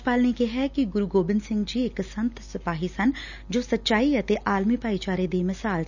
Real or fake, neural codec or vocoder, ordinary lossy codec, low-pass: real; none; none; 7.2 kHz